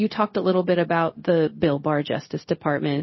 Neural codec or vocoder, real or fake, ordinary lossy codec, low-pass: codec, 16 kHz, 0.4 kbps, LongCat-Audio-Codec; fake; MP3, 24 kbps; 7.2 kHz